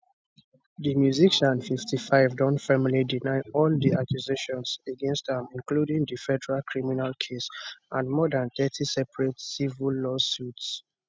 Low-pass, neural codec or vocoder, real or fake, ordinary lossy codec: none; none; real; none